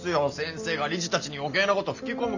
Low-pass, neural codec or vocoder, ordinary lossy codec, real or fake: 7.2 kHz; none; none; real